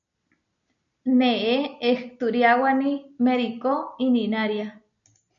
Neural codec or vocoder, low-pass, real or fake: none; 7.2 kHz; real